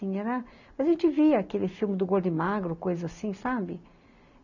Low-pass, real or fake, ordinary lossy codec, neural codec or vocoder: 7.2 kHz; real; none; none